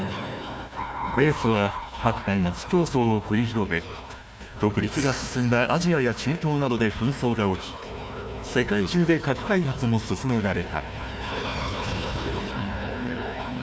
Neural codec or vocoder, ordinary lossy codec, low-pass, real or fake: codec, 16 kHz, 1 kbps, FunCodec, trained on Chinese and English, 50 frames a second; none; none; fake